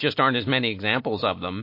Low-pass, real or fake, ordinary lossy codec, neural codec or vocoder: 5.4 kHz; real; MP3, 32 kbps; none